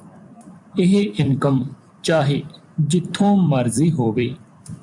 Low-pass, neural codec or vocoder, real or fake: 10.8 kHz; vocoder, 44.1 kHz, 128 mel bands every 256 samples, BigVGAN v2; fake